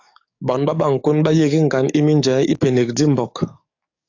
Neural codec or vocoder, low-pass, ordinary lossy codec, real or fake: codec, 44.1 kHz, 7.8 kbps, DAC; 7.2 kHz; AAC, 48 kbps; fake